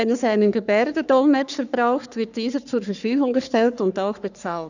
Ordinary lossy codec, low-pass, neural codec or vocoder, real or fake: none; 7.2 kHz; codec, 44.1 kHz, 3.4 kbps, Pupu-Codec; fake